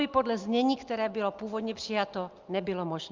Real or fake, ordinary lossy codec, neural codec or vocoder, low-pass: real; Opus, 24 kbps; none; 7.2 kHz